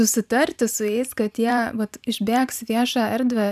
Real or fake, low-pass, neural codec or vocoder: fake; 14.4 kHz; vocoder, 44.1 kHz, 128 mel bands every 512 samples, BigVGAN v2